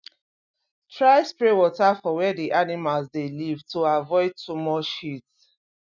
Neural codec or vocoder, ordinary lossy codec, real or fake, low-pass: none; none; real; 7.2 kHz